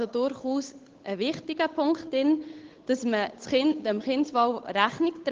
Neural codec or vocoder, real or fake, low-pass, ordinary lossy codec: codec, 16 kHz, 8 kbps, FunCodec, trained on Chinese and English, 25 frames a second; fake; 7.2 kHz; Opus, 16 kbps